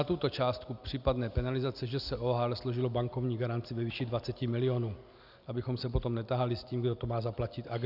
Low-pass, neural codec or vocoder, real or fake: 5.4 kHz; none; real